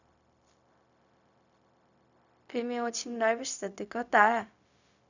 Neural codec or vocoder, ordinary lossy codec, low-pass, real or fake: codec, 16 kHz, 0.4 kbps, LongCat-Audio-Codec; none; 7.2 kHz; fake